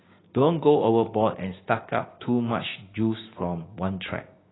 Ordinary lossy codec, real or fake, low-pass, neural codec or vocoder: AAC, 16 kbps; fake; 7.2 kHz; codec, 16 kHz, 6 kbps, DAC